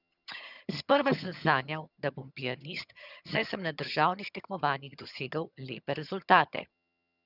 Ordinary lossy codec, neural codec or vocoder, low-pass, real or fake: none; vocoder, 22.05 kHz, 80 mel bands, HiFi-GAN; 5.4 kHz; fake